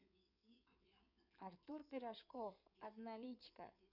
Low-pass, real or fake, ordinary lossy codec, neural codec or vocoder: 5.4 kHz; fake; none; codec, 16 kHz in and 24 kHz out, 2.2 kbps, FireRedTTS-2 codec